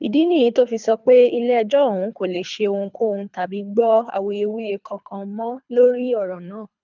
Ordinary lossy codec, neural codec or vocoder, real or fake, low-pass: none; codec, 24 kHz, 3 kbps, HILCodec; fake; 7.2 kHz